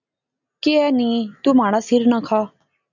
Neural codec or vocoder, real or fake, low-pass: none; real; 7.2 kHz